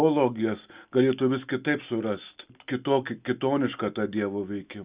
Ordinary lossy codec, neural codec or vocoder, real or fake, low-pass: Opus, 64 kbps; none; real; 3.6 kHz